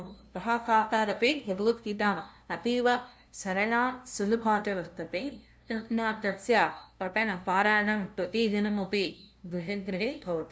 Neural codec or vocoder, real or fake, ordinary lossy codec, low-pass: codec, 16 kHz, 0.5 kbps, FunCodec, trained on LibriTTS, 25 frames a second; fake; none; none